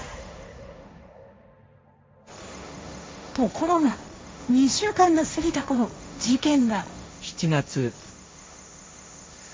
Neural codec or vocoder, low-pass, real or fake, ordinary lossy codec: codec, 16 kHz, 1.1 kbps, Voila-Tokenizer; none; fake; none